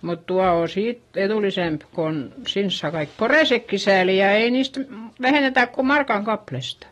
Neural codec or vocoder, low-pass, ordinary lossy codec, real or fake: none; 19.8 kHz; AAC, 32 kbps; real